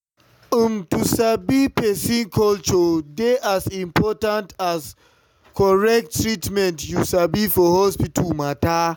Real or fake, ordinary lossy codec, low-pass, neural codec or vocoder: real; none; none; none